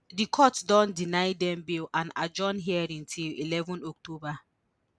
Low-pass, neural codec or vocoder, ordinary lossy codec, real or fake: none; none; none; real